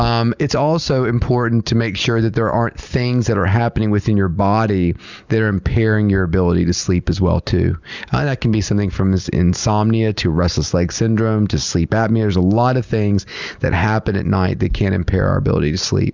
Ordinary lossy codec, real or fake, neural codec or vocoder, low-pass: Opus, 64 kbps; real; none; 7.2 kHz